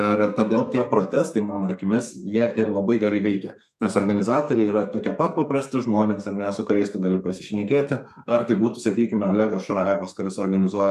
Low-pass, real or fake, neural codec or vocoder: 14.4 kHz; fake; codec, 32 kHz, 1.9 kbps, SNAC